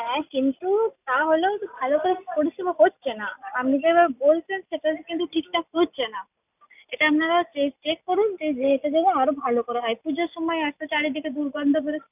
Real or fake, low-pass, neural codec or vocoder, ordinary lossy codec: real; 3.6 kHz; none; none